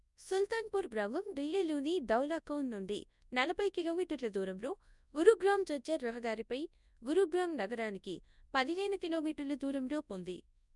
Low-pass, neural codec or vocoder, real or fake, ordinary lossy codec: 10.8 kHz; codec, 24 kHz, 0.9 kbps, WavTokenizer, large speech release; fake; none